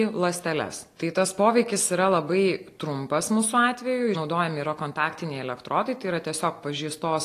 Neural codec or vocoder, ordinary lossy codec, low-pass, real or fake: none; AAC, 48 kbps; 14.4 kHz; real